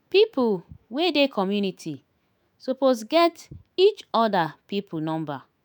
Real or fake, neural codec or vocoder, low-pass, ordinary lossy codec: fake; autoencoder, 48 kHz, 128 numbers a frame, DAC-VAE, trained on Japanese speech; none; none